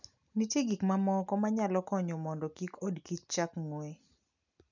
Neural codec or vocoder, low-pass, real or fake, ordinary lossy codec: none; 7.2 kHz; real; none